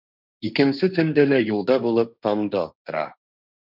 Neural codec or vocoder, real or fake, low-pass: codec, 16 kHz, 1.1 kbps, Voila-Tokenizer; fake; 5.4 kHz